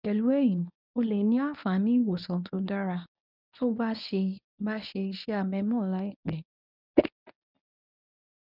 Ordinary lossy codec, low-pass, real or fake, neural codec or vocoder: none; 5.4 kHz; fake; codec, 24 kHz, 0.9 kbps, WavTokenizer, medium speech release version 1